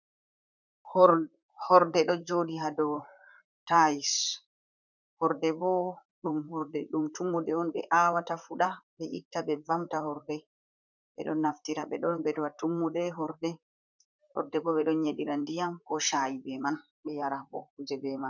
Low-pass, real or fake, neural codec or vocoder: 7.2 kHz; fake; vocoder, 44.1 kHz, 128 mel bands, Pupu-Vocoder